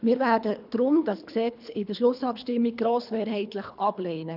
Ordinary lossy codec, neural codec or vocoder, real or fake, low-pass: none; codec, 24 kHz, 3 kbps, HILCodec; fake; 5.4 kHz